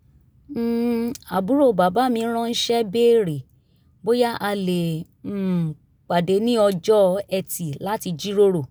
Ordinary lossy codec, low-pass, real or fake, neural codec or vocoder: none; none; real; none